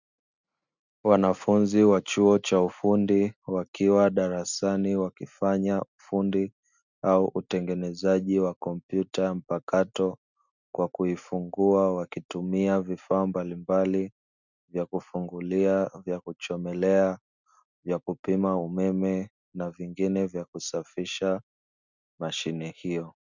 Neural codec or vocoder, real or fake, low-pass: none; real; 7.2 kHz